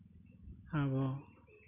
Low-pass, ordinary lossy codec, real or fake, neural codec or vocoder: 3.6 kHz; none; real; none